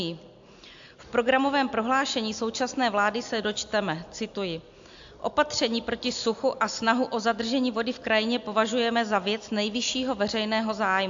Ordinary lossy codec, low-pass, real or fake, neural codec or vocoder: AAC, 64 kbps; 7.2 kHz; real; none